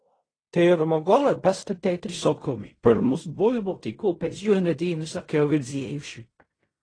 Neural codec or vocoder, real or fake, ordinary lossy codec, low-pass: codec, 16 kHz in and 24 kHz out, 0.4 kbps, LongCat-Audio-Codec, fine tuned four codebook decoder; fake; AAC, 32 kbps; 9.9 kHz